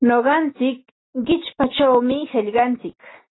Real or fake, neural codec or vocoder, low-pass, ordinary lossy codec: real; none; 7.2 kHz; AAC, 16 kbps